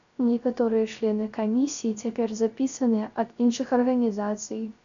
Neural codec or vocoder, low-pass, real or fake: codec, 16 kHz, 0.3 kbps, FocalCodec; 7.2 kHz; fake